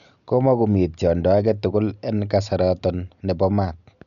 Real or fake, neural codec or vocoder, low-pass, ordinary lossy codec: real; none; 7.2 kHz; none